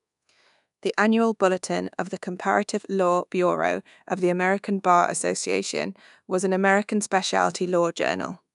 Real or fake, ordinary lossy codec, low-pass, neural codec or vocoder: fake; none; 10.8 kHz; codec, 24 kHz, 1.2 kbps, DualCodec